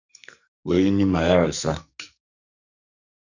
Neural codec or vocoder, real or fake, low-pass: codec, 44.1 kHz, 2.6 kbps, SNAC; fake; 7.2 kHz